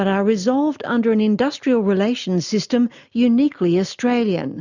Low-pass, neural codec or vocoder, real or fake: 7.2 kHz; none; real